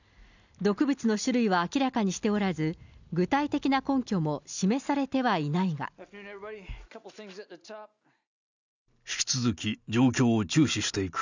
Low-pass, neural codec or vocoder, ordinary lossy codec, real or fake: 7.2 kHz; none; none; real